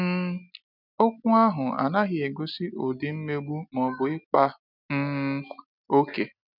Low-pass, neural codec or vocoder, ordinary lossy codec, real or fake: 5.4 kHz; none; none; real